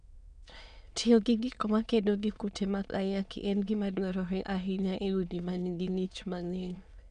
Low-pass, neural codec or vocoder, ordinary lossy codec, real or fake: 9.9 kHz; autoencoder, 22.05 kHz, a latent of 192 numbers a frame, VITS, trained on many speakers; none; fake